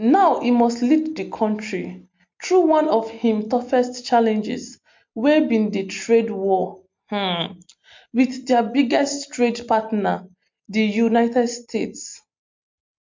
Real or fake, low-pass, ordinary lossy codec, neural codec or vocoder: real; 7.2 kHz; MP3, 48 kbps; none